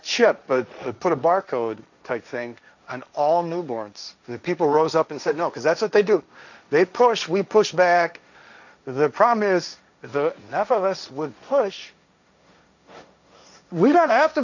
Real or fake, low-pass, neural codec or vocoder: fake; 7.2 kHz; codec, 16 kHz, 1.1 kbps, Voila-Tokenizer